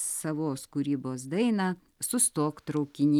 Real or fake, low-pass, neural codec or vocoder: real; 19.8 kHz; none